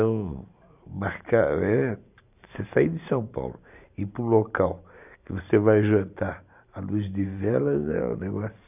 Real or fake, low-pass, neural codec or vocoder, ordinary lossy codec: fake; 3.6 kHz; vocoder, 44.1 kHz, 128 mel bands every 512 samples, BigVGAN v2; none